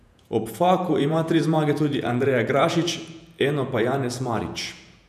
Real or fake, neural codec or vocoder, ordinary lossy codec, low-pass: fake; vocoder, 48 kHz, 128 mel bands, Vocos; none; 14.4 kHz